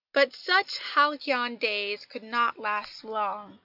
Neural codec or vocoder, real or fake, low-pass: none; real; 5.4 kHz